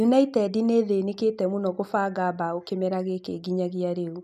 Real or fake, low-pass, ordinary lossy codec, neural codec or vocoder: real; 14.4 kHz; none; none